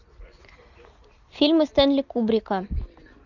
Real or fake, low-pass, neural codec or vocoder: real; 7.2 kHz; none